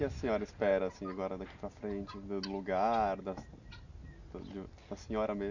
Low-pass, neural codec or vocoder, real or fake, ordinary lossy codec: 7.2 kHz; none; real; none